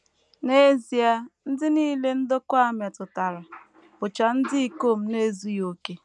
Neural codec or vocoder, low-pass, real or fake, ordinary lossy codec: none; 10.8 kHz; real; none